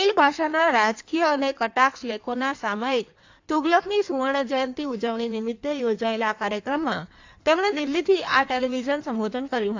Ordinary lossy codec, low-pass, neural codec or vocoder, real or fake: AAC, 48 kbps; 7.2 kHz; codec, 16 kHz in and 24 kHz out, 1.1 kbps, FireRedTTS-2 codec; fake